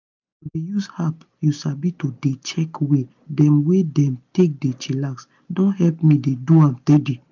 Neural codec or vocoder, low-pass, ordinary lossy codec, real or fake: none; 7.2 kHz; none; real